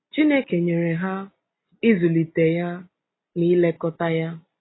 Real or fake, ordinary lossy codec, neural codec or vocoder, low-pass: real; AAC, 16 kbps; none; 7.2 kHz